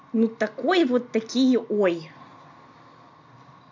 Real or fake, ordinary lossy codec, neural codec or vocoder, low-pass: real; MP3, 64 kbps; none; 7.2 kHz